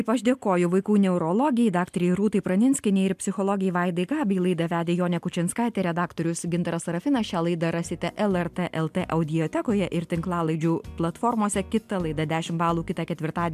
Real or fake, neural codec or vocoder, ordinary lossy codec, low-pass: real; none; MP3, 96 kbps; 14.4 kHz